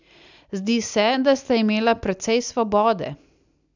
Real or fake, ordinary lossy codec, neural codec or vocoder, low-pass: real; none; none; 7.2 kHz